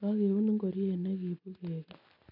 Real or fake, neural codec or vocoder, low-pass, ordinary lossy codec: real; none; 5.4 kHz; MP3, 48 kbps